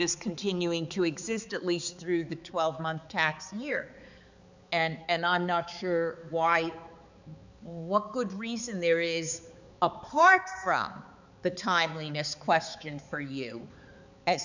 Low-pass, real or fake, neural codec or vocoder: 7.2 kHz; fake; codec, 16 kHz, 4 kbps, X-Codec, HuBERT features, trained on balanced general audio